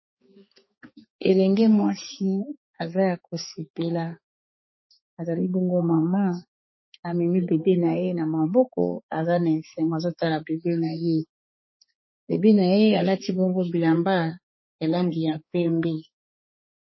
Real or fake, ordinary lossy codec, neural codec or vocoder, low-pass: fake; MP3, 24 kbps; codec, 16 kHz, 4 kbps, X-Codec, HuBERT features, trained on balanced general audio; 7.2 kHz